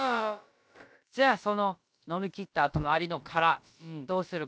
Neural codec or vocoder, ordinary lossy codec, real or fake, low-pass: codec, 16 kHz, about 1 kbps, DyCAST, with the encoder's durations; none; fake; none